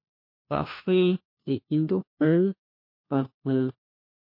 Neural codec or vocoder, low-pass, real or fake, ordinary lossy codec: codec, 16 kHz, 1 kbps, FunCodec, trained on LibriTTS, 50 frames a second; 5.4 kHz; fake; MP3, 32 kbps